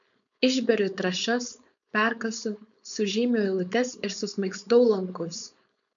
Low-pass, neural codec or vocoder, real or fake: 7.2 kHz; codec, 16 kHz, 4.8 kbps, FACodec; fake